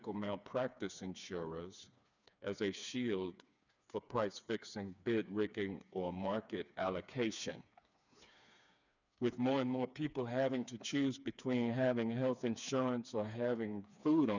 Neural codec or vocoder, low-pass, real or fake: codec, 16 kHz, 4 kbps, FreqCodec, smaller model; 7.2 kHz; fake